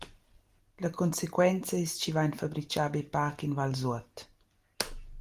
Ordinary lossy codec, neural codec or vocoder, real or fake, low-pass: Opus, 24 kbps; none; real; 14.4 kHz